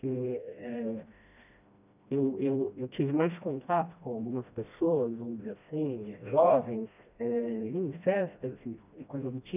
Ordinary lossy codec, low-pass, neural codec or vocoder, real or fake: none; 3.6 kHz; codec, 16 kHz, 1 kbps, FreqCodec, smaller model; fake